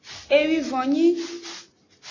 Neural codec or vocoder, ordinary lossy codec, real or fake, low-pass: none; AAC, 32 kbps; real; 7.2 kHz